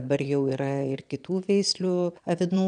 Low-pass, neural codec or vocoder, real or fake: 9.9 kHz; vocoder, 22.05 kHz, 80 mel bands, Vocos; fake